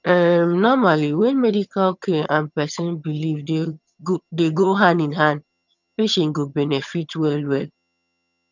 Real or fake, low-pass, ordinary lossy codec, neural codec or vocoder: fake; 7.2 kHz; none; vocoder, 22.05 kHz, 80 mel bands, HiFi-GAN